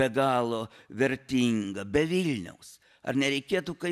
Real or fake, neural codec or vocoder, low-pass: real; none; 14.4 kHz